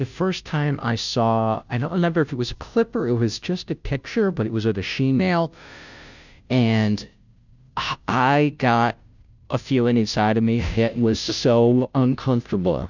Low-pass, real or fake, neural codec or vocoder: 7.2 kHz; fake; codec, 16 kHz, 0.5 kbps, FunCodec, trained on Chinese and English, 25 frames a second